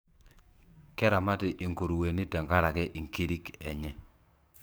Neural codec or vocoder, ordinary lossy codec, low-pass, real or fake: codec, 44.1 kHz, 7.8 kbps, DAC; none; none; fake